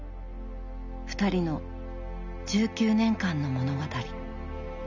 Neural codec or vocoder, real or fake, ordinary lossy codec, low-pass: none; real; none; 7.2 kHz